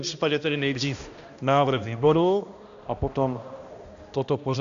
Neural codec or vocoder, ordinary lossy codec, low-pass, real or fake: codec, 16 kHz, 1 kbps, X-Codec, HuBERT features, trained on balanced general audio; MP3, 64 kbps; 7.2 kHz; fake